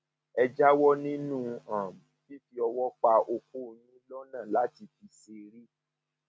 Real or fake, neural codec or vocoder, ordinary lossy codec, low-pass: real; none; none; none